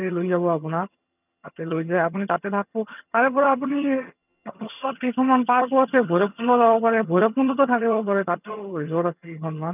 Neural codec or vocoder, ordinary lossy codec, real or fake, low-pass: vocoder, 22.05 kHz, 80 mel bands, HiFi-GAN; none; fake; 3.6 kHz